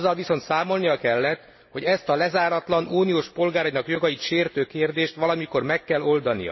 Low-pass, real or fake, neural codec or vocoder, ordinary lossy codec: 7.2 kHz; real; none; MP3, 24 kbps